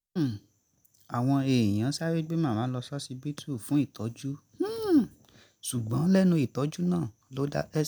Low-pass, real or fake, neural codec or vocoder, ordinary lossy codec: none; real; none; none